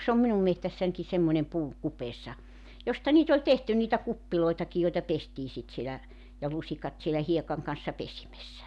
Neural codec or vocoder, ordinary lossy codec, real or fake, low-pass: none; none; real; none